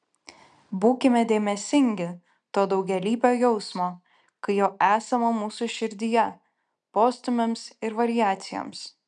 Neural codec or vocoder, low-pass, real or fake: none; 9.9 kHz; real